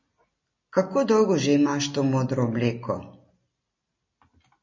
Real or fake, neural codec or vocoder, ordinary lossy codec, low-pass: real; none; MP3, 32 kbps; 7.2 kHz